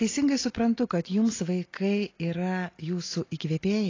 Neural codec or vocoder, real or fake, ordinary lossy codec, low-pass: none; real; AAC, 32 kbps; 7.2 kHz